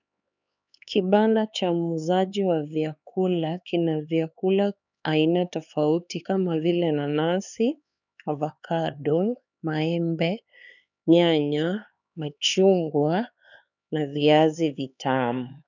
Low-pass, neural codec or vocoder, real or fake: 7.2 kHz; codec, 16 kHz, 4 kbps, X-Codec, HuBERT features, trained on LibriSpeech; fake